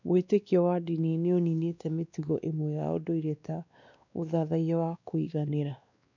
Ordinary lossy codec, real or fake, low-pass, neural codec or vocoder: none; fake; 7.2 kHz; codec, 16 kHz, 2 kbps, X-Codec, WavLM features, trained on Multilingual LibriSpeech